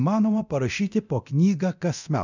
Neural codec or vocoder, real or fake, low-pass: codec, 24 kHz, 0.9 kbps, DualCodec; fake; 7.2 kHz